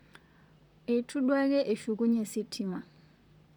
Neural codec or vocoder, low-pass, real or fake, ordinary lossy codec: vocoder, 44.1 kHz, 128 mel bands, Pupu-Vocoder; 19.8 kHz; fake; none